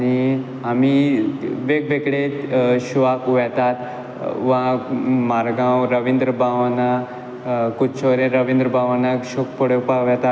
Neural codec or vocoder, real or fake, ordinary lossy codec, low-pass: none; real; none; none